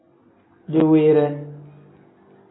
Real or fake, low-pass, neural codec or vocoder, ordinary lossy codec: real; 7.2 kHz; none; AAC, 16 kbps